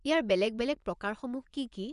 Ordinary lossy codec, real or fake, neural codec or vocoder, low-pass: none; fake; vocoder, 24 kHz, 100 mel bands, Vocos; 10.8 kHz